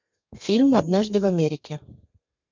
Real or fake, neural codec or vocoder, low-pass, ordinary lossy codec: fake; codec, 32 kHz, 1.9 kbps, SNAC; 7.2 kHz; MP3, 64 kbps